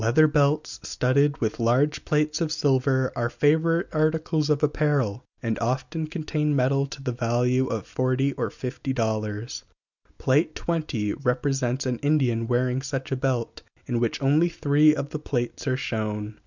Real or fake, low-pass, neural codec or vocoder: real; 7.2 kHz; none